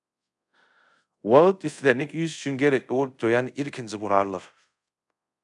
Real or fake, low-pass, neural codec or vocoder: fake; 10.8 kHz; codec, 24 kHz, 0.5 kbps, DualCodec